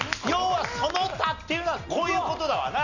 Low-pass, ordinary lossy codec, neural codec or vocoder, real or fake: 7.2 kHz; none; none; real